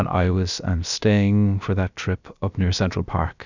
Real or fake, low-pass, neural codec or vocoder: fake; 7.2 kHz; codec, 16 kHz, about 1 kbps, DyCAST, with the encoder's durations